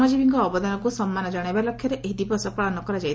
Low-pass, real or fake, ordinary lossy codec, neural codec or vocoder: none; real; none; none